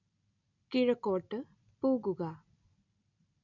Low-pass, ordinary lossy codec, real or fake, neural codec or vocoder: 7.2 kHz; none; real; none